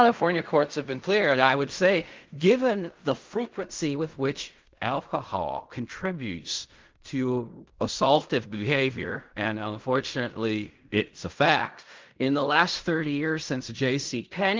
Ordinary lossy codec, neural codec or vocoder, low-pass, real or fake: Opus, 24 kbps; codec, 16 kHz in and 24 kHz out, 0.4 kbps, LongCat-Audio-Codec, fine tuned four codebook decoder; 7.2 kHz; fake